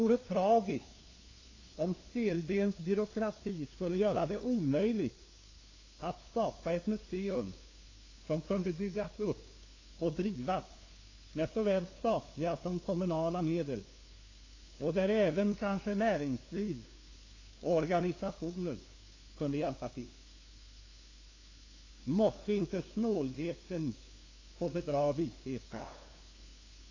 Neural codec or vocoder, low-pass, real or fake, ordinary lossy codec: codec, 16 kHz, 2 kbps, FunCodec, trained on LibriTTS, 25 frames a second; 7.2 kHz; fake; AAC, 32 kbps